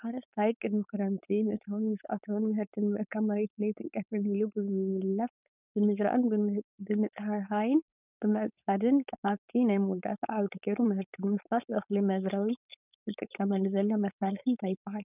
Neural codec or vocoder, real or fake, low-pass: codec, 16 kHz, 4.8 kbps, FACodec; fake; 3.6 kHz